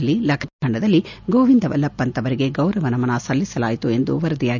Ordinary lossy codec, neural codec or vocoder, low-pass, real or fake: none; none; 7.2 kHz; real